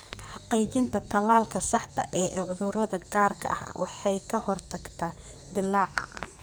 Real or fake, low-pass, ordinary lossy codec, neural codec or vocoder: fake; none; none; codec, 44.1 kHz, 2.6 kbps, SNAC